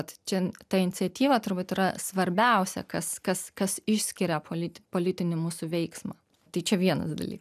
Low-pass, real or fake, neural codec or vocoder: 14.4 kHz; real; none